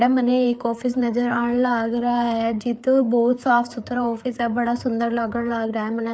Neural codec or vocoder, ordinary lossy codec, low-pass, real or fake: codec, 16 kHz, 4 kbps, FreqCodec, larger model; none; none; fake